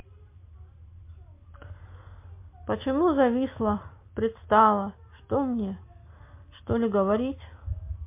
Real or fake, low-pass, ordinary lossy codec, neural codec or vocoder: real; 3.6 kHz; MP3, 24 kbps; none